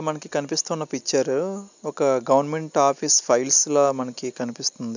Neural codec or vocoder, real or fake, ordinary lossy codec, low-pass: none; real; none; 7.2 kHz